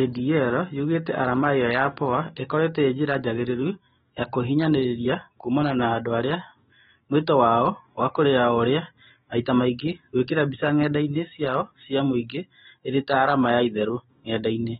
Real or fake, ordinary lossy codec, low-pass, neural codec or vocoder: fake; AAC, 16 kbps; 19.8 kHz; autoencoder, 48 kHz, 128 numbers a frame, DAC-VAE, trained on Japanese speech